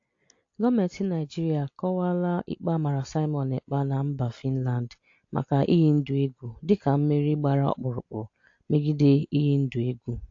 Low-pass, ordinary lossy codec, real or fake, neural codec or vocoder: 7.2 kHz; AAC, 48 kbps; real; none